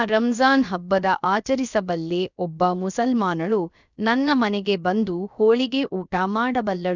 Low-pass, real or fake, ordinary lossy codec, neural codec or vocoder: 7.2 kHz; fake; none; codec, 16 kHz, about 1 kbps, DyCAST, with the encoder's durations